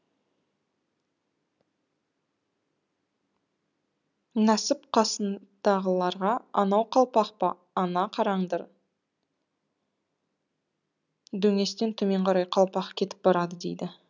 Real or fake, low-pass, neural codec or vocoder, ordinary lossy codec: real; 7.2 kHz; none; none